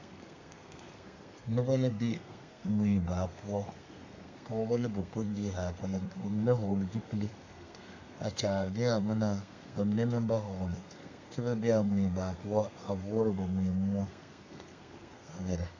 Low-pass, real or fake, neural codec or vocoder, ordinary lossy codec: 7.2 kHz; fake; codec, 32 kHz, 1.9 kbps, SNAC; AAC, 48 kbps